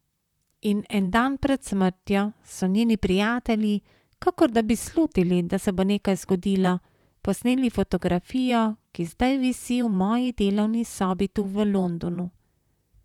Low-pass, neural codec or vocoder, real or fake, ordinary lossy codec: 19.8 kHz; vocoder, 44.1 kHz, 128 mel bands, Pupu-Vocoder; fake; none